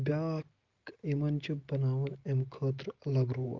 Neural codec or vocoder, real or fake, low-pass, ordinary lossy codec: none; real; 7.2 kHz; Opus, 32 kbps